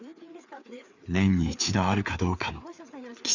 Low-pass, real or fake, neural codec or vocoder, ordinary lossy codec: 7.2 kHz; fake; codec, 16 kHz, 4 kbps, FreqCodec, larger model; Opus, 64 kbps